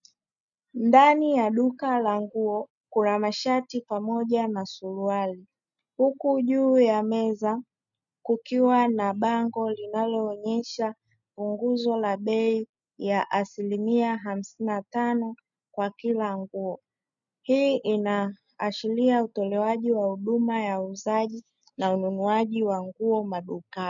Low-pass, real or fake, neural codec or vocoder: 7.2 kHz; real; none